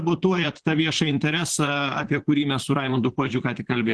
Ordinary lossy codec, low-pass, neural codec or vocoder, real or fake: Opus, 16 kbps; 10.8 kHz; vocoder, 44.1 kHz, 128 mel bands, Pupu-Vocoder; fake